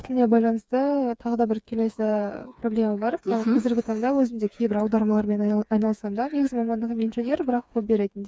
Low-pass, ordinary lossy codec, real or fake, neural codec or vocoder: none; none; fake; codec, 16 kHz, 4 kbps, FreqCodec, smaller model